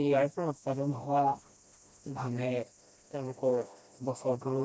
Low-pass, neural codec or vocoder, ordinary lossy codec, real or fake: none; codec, 16 kHz, 1 kbps, FreqCodec, smaller model; none; fake